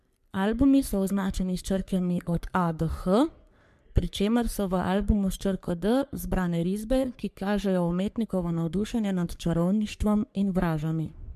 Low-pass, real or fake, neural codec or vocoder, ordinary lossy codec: 14.4 kHz; fake; codec, 44.1 kHz, 3.4 kbps, Pupu-Codec; MP3, 96 kbps